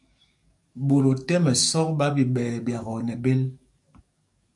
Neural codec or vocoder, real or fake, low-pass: codec, 44.1 kHz, 7.8 kbps, DAC; fake; 10.8 kHz